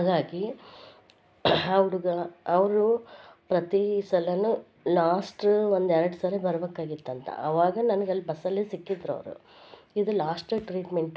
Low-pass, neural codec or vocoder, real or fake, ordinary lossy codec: none; none; real; none